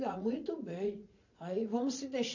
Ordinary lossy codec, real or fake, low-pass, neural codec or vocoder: none; fake; 7.2 kHz; vocoder, 44.1 kHz, 80 mel bands, Vocos